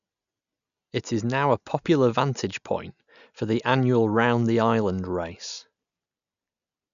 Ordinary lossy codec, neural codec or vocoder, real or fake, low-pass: none; none; real; 7.2 kHz